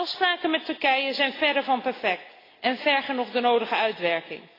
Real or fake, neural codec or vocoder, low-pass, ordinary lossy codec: real; none; 5.4 kHz; AAC, 24 kbps